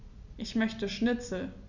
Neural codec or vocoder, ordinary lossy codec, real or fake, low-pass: none; none; real; 7.2 kHz